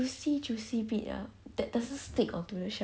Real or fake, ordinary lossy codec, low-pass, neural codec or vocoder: real; none; none; none